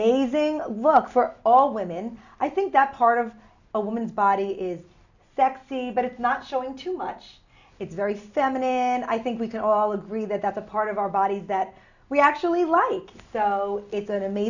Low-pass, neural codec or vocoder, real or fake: 7.2 kHz; none; real